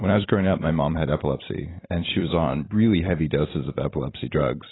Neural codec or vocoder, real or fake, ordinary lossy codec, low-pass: vocoder, 44.1 kHz, 128 mel bands every 256 samples, BigVGAN v2; fake; AAC, 16 kbps; 7.2 kHz